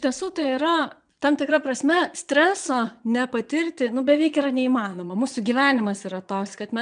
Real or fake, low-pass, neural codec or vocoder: fake; 9.9 kHz; vocoder, 22.05 kHz, 80 mel bands, Vocos